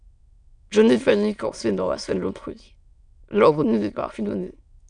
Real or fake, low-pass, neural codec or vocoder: fake; 9.9 kHz; autoencoder, 22.05 kHz, a latent of 192 numbers a frame, VITS, trained on many speakers